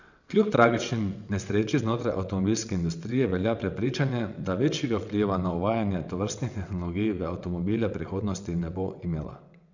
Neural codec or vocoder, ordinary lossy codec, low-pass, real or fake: vocoder, 22.05 kHz, 80 mel bands, WaveNeXt; none; 7.2 kHz; fake